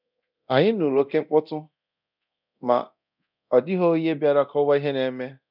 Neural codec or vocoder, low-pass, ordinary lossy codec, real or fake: codec, 24 kHz, 0.9 kbps, DualCodec; 5.4 kHz; none; fake